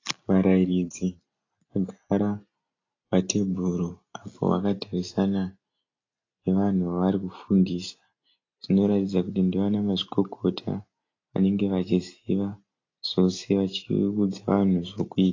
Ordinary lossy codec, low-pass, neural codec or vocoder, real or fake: AAC, 32 kbps; 7.2 kHz; none; real